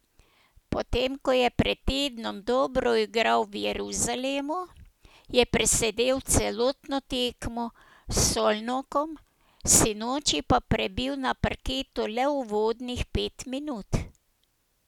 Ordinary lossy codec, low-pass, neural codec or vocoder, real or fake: none; 19.8 kHz; none; real